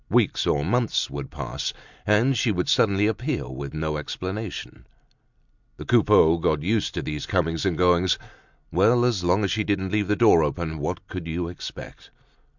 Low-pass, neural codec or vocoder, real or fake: 7.2 kHz; none; real